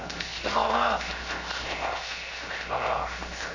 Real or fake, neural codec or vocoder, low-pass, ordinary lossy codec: fake; codec, 16 kHz, 0.7 kbps, FocalCodec; 7.2 kHz; AAC, 48 kbps